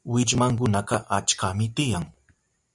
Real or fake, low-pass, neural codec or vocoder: real; 10.8 kHz; none